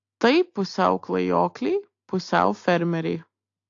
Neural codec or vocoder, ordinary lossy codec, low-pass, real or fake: none; AAC, 48 kbps; 7.2 kHz; real